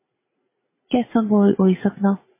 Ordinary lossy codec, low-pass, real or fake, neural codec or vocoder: MP3, 16 kbps; 3.6 kHz; fake; vocoder, 44.1 kHz, 80 mel bands, Vocos